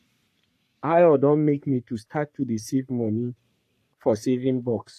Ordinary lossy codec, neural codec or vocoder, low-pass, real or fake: AAC, 64 kbps; codec, 44.1 kHz, 3.4 kbps, Pupu-Codec; 14.4 kHz; fake